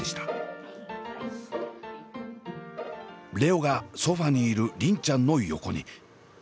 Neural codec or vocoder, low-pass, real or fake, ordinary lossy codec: none; none; real; none